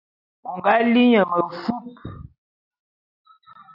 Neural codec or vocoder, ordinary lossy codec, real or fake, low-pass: none; AAC, 48 kbps; real; 5.4 kHz